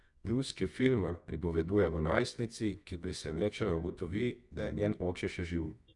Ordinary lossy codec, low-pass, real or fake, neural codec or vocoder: none; 10.8 kHz; fake; codec, 24 kHz, 0.9 kbps, WavTokenizer, medium music audio release